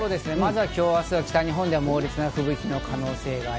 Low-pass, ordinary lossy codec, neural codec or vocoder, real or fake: none; none; none; real